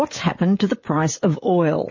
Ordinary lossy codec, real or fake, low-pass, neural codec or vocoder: MP3, 32 kbps; fake; 7.2 kHz; codec, 16 kHz, 16 kbps, FreqCodec, smaller model